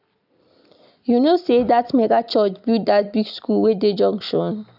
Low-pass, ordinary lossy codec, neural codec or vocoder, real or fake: 5.4 kHz; none; none; real